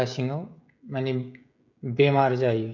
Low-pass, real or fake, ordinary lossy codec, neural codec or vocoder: 7.2 kHz; fake; none; codec, 16 kHz, 16 kbps, FreqCodec, smaller model